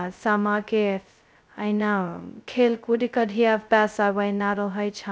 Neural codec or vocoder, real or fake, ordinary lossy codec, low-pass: codec, 16 kHz, 0.2 kbps, FocalCodec; fake; none; none